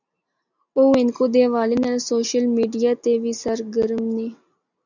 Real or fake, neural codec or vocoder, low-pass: real; none; 7.2 kHz